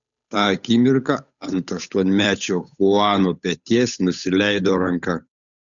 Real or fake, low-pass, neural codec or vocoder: fake; 7.2 kHz; codec, 16 kHz, 8 kbps, FunCodec, trained on Chinese and English, 25 frames a second